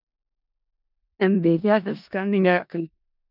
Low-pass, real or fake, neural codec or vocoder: 5.4 kHz; fake; codec, 16 kHz in and 24 kHz out, 0.4 kbps, LongCat-Audio-Codec, four codebook decoder